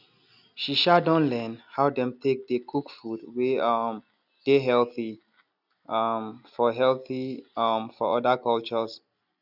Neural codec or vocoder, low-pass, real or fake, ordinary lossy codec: none; 5.4 kHz; real; none